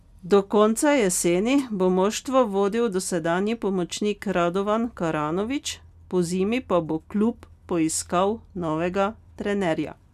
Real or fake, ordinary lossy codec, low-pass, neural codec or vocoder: real; none; 14.4 kHz; none